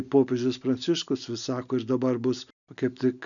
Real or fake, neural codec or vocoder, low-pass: real; none; 7.2 kHz